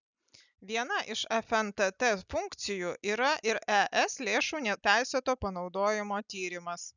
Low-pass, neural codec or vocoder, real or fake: 7.2 kHz; none; real